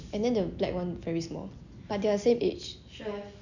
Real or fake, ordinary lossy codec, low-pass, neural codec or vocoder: real; none; 7.2 kHz; none